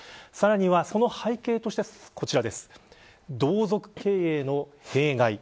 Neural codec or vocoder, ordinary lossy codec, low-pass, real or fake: none; none; none; real